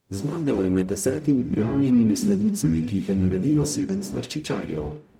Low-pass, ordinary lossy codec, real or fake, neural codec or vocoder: 19.8 kHz; MP3, 96 kbps; fake; codec, 44.1 kHz, 0.9 kbps, DAC